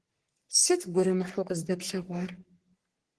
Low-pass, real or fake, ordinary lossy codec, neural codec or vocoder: 10.8 kHz; fake; Opus, 16 kbps; codec, 44.1 kHz, 3.4 kbps, Pupu-Codec